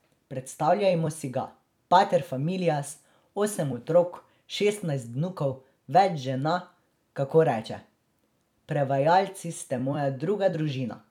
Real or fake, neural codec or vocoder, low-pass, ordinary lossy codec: fake; vocoder, 44.1 kHz, 128 mel bands every 256 samples, BigVGAN v2; 19.8 kHz; none